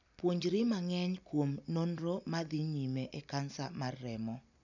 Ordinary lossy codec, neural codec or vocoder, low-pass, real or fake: none; none; 7.2 kHz; real